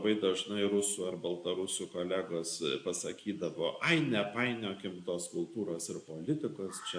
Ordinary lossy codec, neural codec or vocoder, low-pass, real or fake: AAC, 64 kbps; none; 9.9 kHz; real